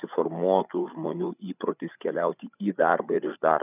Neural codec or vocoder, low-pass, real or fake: codec, 16 kHz, 8 kbps, FreqCodec, larger model; 3.6 kHz; fake